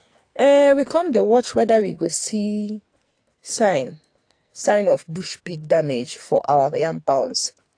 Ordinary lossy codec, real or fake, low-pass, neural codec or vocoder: AAC, 48 kbps; fake; 9.9 kHz; codec, 44.1 kHz, 2.6 kbps, SNAC